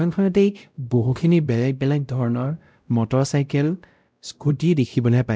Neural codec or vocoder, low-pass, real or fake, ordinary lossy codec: codec, 16 kHz, 0.5 kbps, X-Codec, WavLM features, trained on Multilingual LibriSpeech; none; fake; none